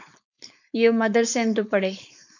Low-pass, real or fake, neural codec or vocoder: 7.2 kHz; fake; codec, 16 kHz, 4.8 kbps, FACodec